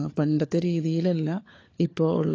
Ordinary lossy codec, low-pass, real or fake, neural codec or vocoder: AAC, 32 kbps; 7.2 kHz; fake; codec, 16 kHz, 4 kbps, FunCodec, trained on LibriTTS, 50 frames a second